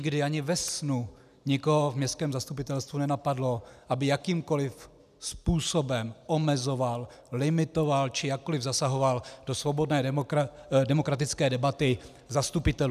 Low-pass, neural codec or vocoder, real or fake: 14.4 kHz; none; real